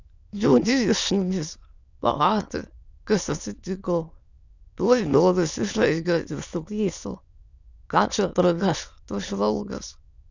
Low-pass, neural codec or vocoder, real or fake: 7.2 kHz; autoencoder, 22.05 kHz, a latent of 192 numbers a frame, VITS, trained on many speakers; fake